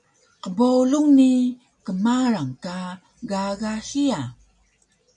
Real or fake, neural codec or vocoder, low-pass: real; none; 10.8 kHz